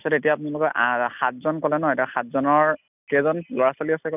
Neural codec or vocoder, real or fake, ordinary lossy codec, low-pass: none; real; none; 3.6 kHz